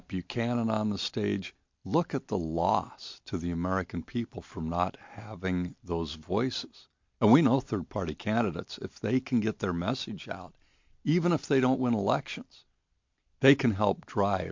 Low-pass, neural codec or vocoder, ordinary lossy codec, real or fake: 7.2 kHz; none; MP3, 48 kbps; real